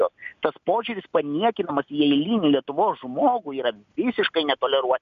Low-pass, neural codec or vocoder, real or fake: 7.2 kHz; none; real